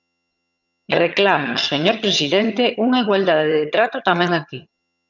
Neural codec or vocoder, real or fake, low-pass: vocoder, 22.05 kHz, 80 mel bands, HiFi-GAN; fake; 7.2 kHz